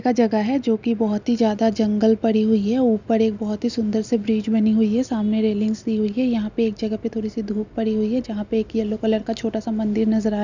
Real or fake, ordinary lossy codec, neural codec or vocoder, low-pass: real; none; none; 7.2 kHz